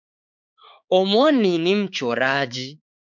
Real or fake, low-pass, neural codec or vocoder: fake; 7.2 kHz; codec, 16 kHz, 4 kbps, X-Codec, HuBERT features, trained on balanced general audio